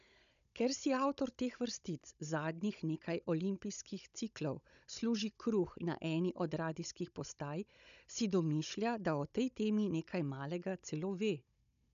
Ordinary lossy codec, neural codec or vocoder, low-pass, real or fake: none; codec, 16 kHz, 16 kbps, FunCodec, trained on Chinese and English, 50 frames a second; 7.2 kHz; fake